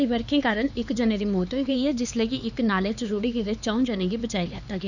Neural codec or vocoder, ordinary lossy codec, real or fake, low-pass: codec, 16 kHz, 4 kbps, X-Codec, HuBERT features, trained on LibriSpeech; none; fake; 7.2 kHz